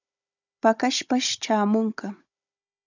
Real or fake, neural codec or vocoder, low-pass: fake; codec, 16 kHz, 16 kbps, FunCodec, trained on Chinese and English, 50 frames a second; 7.2 kHz